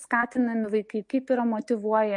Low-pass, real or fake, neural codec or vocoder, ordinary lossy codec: 10.8 kHz; real; none; MP3, 48 kbps